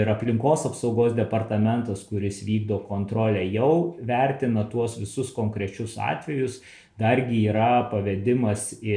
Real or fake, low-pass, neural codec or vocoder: real; 9.9 kHz; none